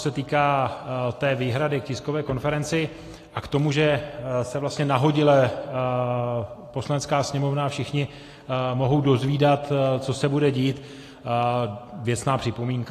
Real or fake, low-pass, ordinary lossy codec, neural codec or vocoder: real; 14.4 kHz; AAC, 48 kbps; none